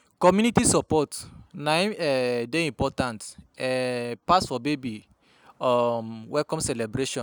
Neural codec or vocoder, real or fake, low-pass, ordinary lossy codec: none; real; none; none